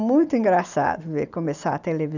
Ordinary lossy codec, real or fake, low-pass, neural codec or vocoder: none; real; 7.2 kHz; none